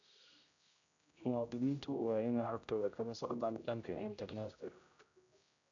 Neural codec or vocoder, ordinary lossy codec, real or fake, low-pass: codec, 16 kHz, 0.5 kbps, X-Codec, HuBERT features, trained on general audio; none; fake; 7.2 kHz